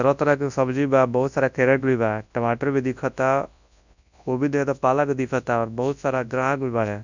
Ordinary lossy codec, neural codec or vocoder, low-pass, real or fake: none; codec, 24 kHz, 0.9 kbps, WavTokenizer, large speech release; 7.2 kHz; fake